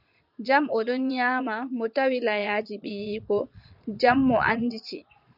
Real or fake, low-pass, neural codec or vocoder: fake; 5.4 kHz; vocoder, 22.05 kHz, 80 mel bands, Vocos